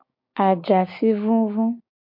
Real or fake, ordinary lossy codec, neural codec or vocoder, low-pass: fake; MP3, 32 kbps; codec, 16 kHz, 6 kbps, DAC; 5.4 kHz